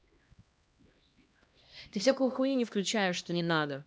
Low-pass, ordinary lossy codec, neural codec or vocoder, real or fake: none; none; codec, 16 kHz, 1 kbps, X-Codec, HuBERT features, trained on LibriSpeech; fake